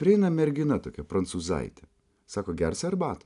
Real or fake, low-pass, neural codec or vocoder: real; 10.8 kHz; none